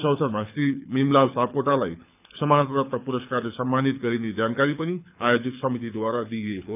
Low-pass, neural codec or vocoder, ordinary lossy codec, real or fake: 3.6 kHz; codec, 24 kHz, 6 kbps, HILCodec; none; fake